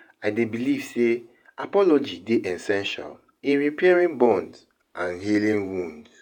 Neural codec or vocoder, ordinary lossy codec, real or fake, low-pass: vocoder, 48 kHz, 128 mel bands, Vocos; none; fake; 19.8 kHz